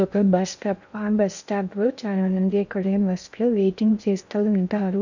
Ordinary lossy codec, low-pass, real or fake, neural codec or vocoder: none; 7.2 kHz; fake; codec, 16 kHz in and 24 kHz out, 0.6 kbps, FocalCodec, streaming, 2048 codes